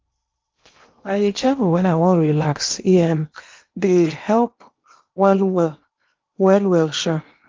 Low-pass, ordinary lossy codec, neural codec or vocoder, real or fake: 7.2 kHz; Opus, 24 kbps; codec, 16 kHz in and 24 kHz out, 0.8 kbps, FocalCodec, streaming, 65536 codes; fake